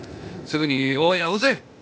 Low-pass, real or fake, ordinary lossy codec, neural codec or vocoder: none; fake; none; codec, 16 kHz, 0.8 kbps, ZipCodec